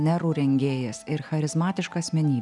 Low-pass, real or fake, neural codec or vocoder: 10.8 kHz; real; none